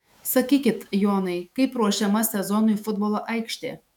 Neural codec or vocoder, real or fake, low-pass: autoencoder, 48 kHz, 128 numbers a frame, DAC-VAE, trained on Japanese speech; fake; 19.8 kHz